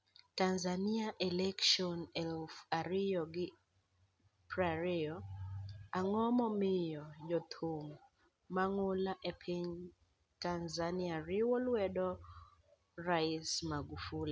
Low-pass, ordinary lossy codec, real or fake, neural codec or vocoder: none; none; real; none